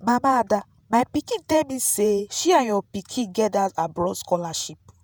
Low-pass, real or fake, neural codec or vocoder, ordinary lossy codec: none; fake; vocoder, 48 kHz, 128 mel bands, Vocos; none